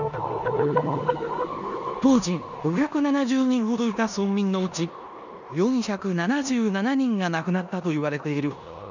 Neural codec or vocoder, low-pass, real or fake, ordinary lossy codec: codec, 16 kHz in and 24 kHz out, 0.9 kbps, LongCat-Audio-Codec, four codebook decoder; 7.2 kHz; fake; none